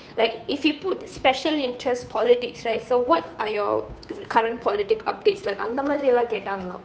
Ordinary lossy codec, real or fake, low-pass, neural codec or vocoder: none; fake; none; codec, 16 kHz, 2 kbps, FunCodec, trained on Chinese and English, 25 frames a second